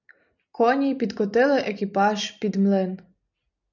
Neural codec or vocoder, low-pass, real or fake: none; 7.2 kHz; real